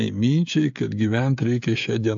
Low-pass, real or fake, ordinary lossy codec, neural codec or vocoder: 7.2 kHz; fake; AAC, 64 kbps; codec, 16 kHz, 16 kbps, FreqCodec, smaller model